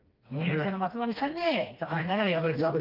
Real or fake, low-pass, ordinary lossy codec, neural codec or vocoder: fake; 5.4 kHz; Opus, 24 kbps; codec, 16 kHz, 2 kbps, FreqCodec, smaller model